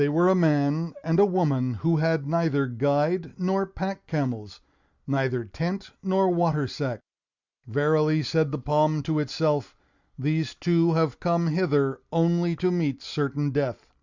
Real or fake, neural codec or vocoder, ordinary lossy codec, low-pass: real; none; Opus, 64 kbps; 7.2 kHz